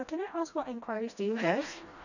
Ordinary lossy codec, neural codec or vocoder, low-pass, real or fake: none; codec, 16 kHz, 1 kbps, FreqCodec, smaller model; 7.2 kHz; fake